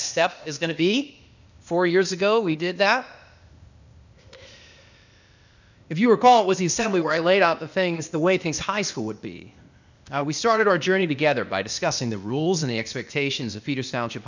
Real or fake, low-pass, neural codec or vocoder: fake; 7.2 kHz; codec, 16 kHz, 0.8 kbps, ZipCodec